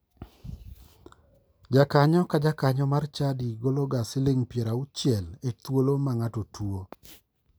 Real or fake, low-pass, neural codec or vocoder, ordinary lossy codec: real; none; none; none